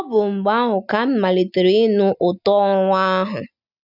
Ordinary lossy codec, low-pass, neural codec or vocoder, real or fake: none; 5.4 kHz; none; real